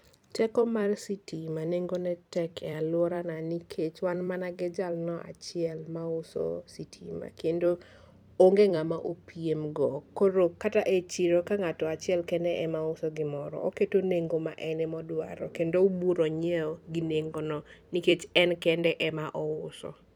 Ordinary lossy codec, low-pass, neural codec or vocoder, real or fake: none; 19.8 kHz; vocoder, 44.1 kHz, 128 mel bands every 256 samples, BigVGAN v2; fake